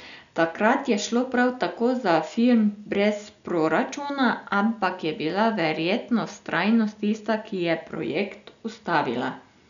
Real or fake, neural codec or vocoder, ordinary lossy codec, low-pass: real; none; none; 7.2 kHz